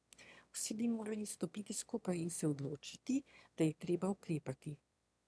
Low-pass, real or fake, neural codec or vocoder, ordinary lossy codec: none; fake; autoencoder, 22.05 kHz, a latent of 192 numbers a frame, VITS, trained on one speaker; none